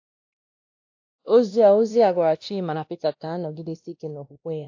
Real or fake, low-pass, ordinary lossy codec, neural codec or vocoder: fake; 7.2 kHz; AAC, 48 kbps; codec, 16 kHz, 1 kbps, X-Codec, WavLM features, trained on Multilingual LibriSpeech